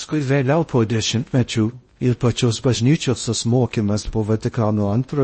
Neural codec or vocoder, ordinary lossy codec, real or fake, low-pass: codec, 16 kHz in and 24 kHz out, 0.6 kbps, FocalCodec, streaming, 4096 codes; MP3, 32 kbps; fake; 10.8 kHz